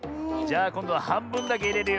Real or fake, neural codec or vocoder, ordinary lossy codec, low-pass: real; none; none; none